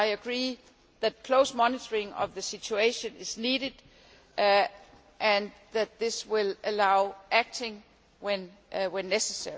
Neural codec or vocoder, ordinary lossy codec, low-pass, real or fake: none; none; none; real